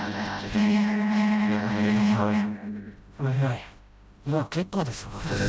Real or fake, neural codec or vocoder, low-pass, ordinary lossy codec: fake; codec, 16 kHz, 0.5 kbps, FreqCodec, smaller model; none; none